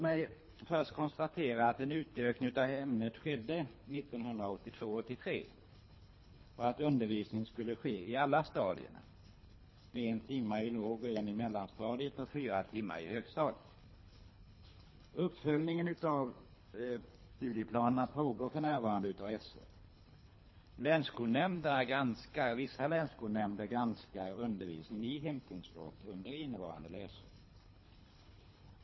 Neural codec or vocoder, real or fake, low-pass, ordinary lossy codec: codec, 24 kHz, 3 kbps, HILCodec; fake; 7.2 kHz; MP3, 24 kbps